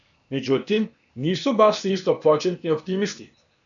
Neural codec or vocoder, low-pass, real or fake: codec, 16 kHz, 0.8 kbps, ZipCodec; 7.2 kHz; fake